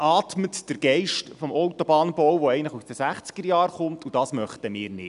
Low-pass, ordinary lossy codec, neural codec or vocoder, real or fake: 10.8 kHz; none; none; real